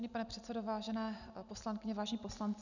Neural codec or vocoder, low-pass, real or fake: none; 7.2 kHz; real